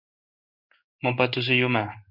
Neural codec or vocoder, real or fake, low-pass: codec, 16 kHz in and 24 kHz out, 1 kbps, XY-Tokenizer; fake; 5.4 kHz